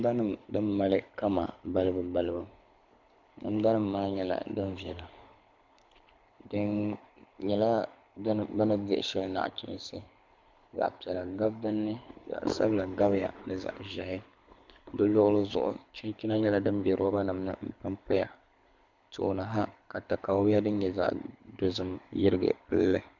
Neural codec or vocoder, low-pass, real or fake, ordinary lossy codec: codec, 24 kHz, 6 kbps, HILCodec; 7.2 kHz; fake; MP3, 64 kbps